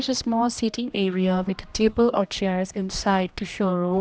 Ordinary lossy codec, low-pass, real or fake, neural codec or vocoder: none; none; fake; codec, 16 kHz, 1 kbps, X-Codec, HuBERT features, trained on general audio